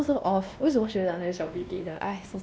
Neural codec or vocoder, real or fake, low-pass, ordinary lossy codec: codec, 16 kHz, 1 kbps, X-Codec, WavLM features, trained on Multilingual LibriSpeech; fake; none; none